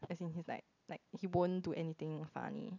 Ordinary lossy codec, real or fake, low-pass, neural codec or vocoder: none; real; 7.2 kHz; none